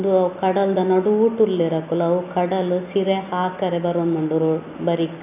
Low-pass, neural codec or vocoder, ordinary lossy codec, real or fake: 3.6 kHz; none; none; real